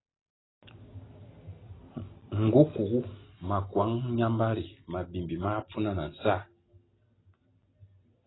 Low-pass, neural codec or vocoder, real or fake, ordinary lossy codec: 7.2 kHz; none; real; AAC, 16 kbps